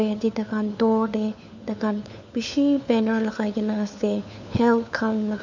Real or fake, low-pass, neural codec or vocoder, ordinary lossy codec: fake; 7.2 kHz; codec, 16 kHz, 4 kbps, FunCodec, trained on LibriTTS, 50 frames a second; none